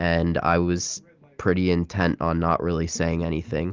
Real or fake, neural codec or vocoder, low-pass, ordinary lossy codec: real; none; 7.2 kHz; Opus, 24 kbps